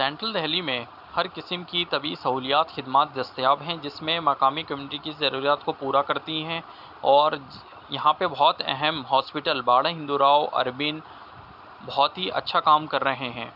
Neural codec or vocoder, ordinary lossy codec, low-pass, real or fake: none; none; 5.4 kHz; real